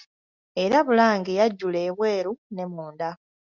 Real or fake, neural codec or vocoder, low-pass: real; none; 7.2 kHz